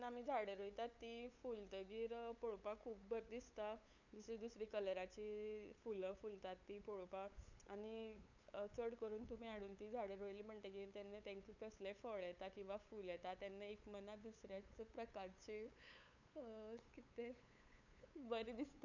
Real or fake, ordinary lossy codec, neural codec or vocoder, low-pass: fake; none; codec, 16 kHz, 8 kbps, FunCodec, trained on LibriTTS, 25 frames a second; 7.2 kHz